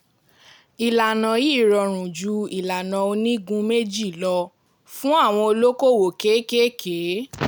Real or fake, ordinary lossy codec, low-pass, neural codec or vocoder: real; none; none; none